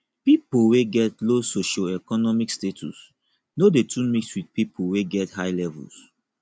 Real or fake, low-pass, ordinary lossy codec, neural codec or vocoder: real; none; none; none